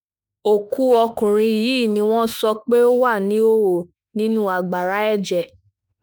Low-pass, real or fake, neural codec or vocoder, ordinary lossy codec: none; fake; autoencoder, 48 kHz, 32 numbers a frame, DAC-VAE, trained on Japanese speech; none